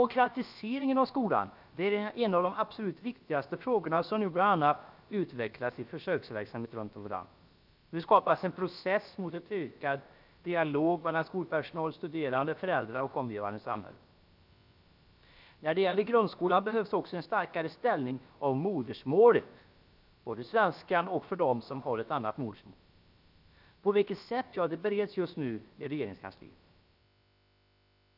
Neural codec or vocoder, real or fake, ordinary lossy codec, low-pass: codec, 16 kHz, about 1 kbps, DyCAST, with the encoder's durations; fake; none; 5.4 kHz